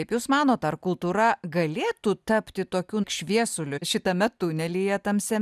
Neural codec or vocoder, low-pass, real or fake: none; 14.4 kHz; real